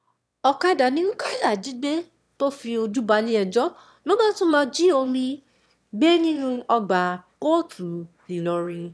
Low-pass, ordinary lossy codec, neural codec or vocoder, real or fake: none; none; autoencoder, 22.05 kHz, a latent of 192 numbers a frame, VITS, trained on one speaker; fake